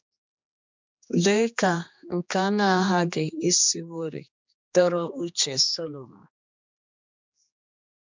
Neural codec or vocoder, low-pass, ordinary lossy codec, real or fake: codec, 16 kHz, 2 kbps, X-Codec, HuBERT features, trained on general audio; 7.2 kHz; MP3, 64 kbps; fake